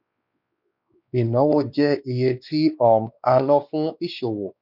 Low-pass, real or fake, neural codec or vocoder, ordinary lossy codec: 5.4 kHz; fake; codec, 16 kHz, 2 kbps, X-Codec, WavLM features, trained on Multilingual LibriSpeech; none